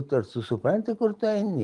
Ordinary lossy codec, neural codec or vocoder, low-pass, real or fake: Opus, 24 kbps; none; 10.8 kHz; real